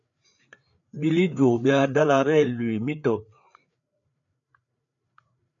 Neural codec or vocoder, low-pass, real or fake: codec, 16 kHz, 4 kbps, FreqCodec, larger model; 7.2 kHz; fake